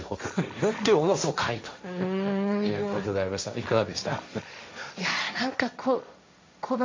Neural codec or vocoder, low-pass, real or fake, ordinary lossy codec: codec, 16 kHz, 1.1 kbps, Voila-Tokenizer; 7.2 kHz; fake; MP3, 48 kbps